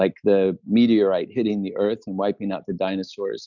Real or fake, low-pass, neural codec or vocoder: real; 7.2 kHz; none